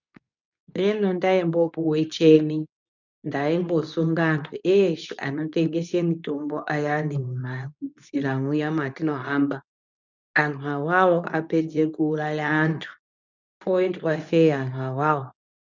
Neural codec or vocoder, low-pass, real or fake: codec, 24 kHz, 0.9 kbps, WavTokenizer, medium speech release version 2; 7.2 kHz; fake